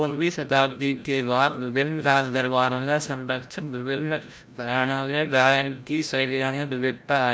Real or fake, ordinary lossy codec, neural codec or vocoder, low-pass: fake; none; codec, 16 kHz, 0.5 kbps, FreqCodec, larger model; none